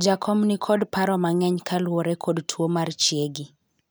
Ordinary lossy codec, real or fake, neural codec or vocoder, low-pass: none; real; none; none